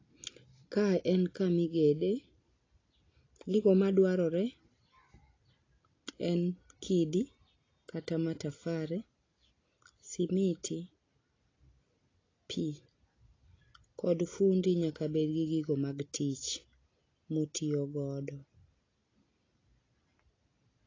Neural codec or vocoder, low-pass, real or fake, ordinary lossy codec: none; 7.2 kHz; real; AAC, 32 kbps